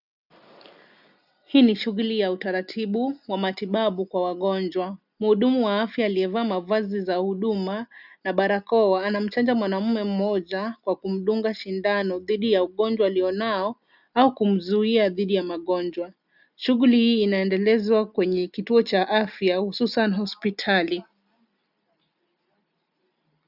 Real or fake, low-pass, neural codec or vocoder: real; 5.4 kHz; none